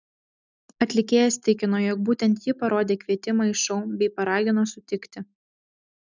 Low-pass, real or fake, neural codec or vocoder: 7.2 kHz; real; none